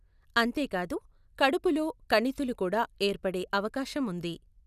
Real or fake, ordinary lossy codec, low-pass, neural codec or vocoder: real; none; 14.4 kHz; none